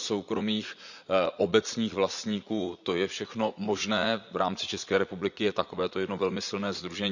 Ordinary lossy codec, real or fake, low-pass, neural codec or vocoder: none; fake; 7.2 kHz; vocoder, 44.1 kHz, 80 mel bands, Vocos